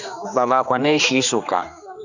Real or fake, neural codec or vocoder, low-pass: fake; codec, 16 kHz, 2 kbps, X-Codec, HuBERT features, trained on general audio; 7.2 kHz